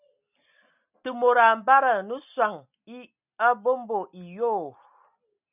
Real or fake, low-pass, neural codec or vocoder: real; 3.6 kHz; none